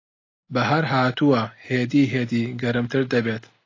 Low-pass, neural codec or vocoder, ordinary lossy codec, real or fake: 7.2 kHz; none; AAC, 32 kbps; real